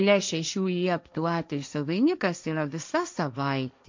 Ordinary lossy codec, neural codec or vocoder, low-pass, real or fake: MP3, 64 kbps; codec, 16 kHz, 1.1 kbps, Voila-Tokenizer; 7.2 kHz; fake